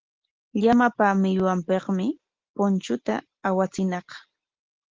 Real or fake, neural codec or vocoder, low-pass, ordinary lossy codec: real; none; 7.2 kHz; Opus, 16 kbps